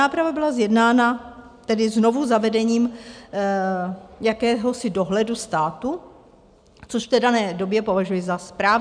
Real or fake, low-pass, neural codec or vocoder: real; 9.9 kHz; none